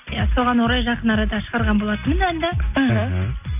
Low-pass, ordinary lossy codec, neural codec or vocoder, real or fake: 3.6 kHz; none; none; real